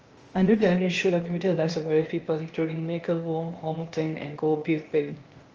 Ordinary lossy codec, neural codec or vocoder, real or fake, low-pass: Opus, 24 kbps; codec, 16 kHz, 0.8 kbps, ZipCodec; fake; 7.2 kHz